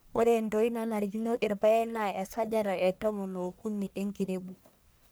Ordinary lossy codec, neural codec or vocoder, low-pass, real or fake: none; codec, 44.1 kHz, 1.7 kbps, Pupu-Codec; none; fake